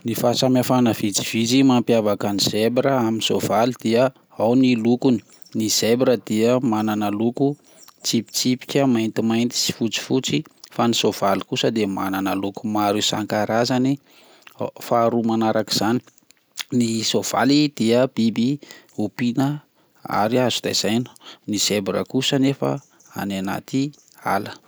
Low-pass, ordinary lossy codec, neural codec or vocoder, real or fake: none; none; none; real